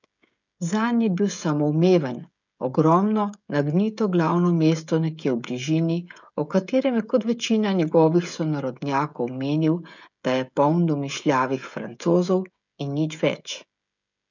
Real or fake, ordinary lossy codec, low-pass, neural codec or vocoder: fake; none; 7.2 kHz; codec, 16 kHz, 16 kbps, FreqCodec, smaller model